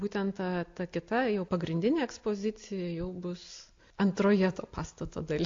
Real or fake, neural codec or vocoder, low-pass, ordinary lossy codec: real; none; 7.2 kHz; AAC, 48 kbps